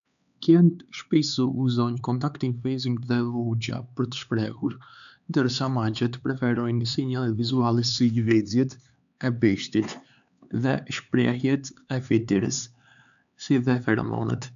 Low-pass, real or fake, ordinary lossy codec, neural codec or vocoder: 7.2 kHz; fake; none; codec, 16 kHz, 4 kbps, X-Codec, HuBERT features, trained on LibriSpeech